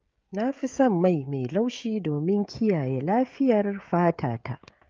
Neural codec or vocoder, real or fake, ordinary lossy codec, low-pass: codec, 16 kHz, 16 kbps, FreqCodec, smaller model; fake; Opus, 24 kbps; 7.2 kHz